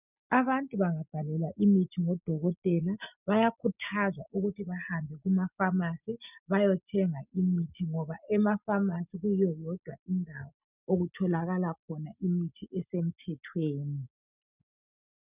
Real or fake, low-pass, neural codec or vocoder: real; 3.6 kHz; none